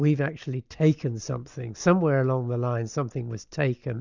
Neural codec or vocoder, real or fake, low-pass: none; real; 7.2 kHz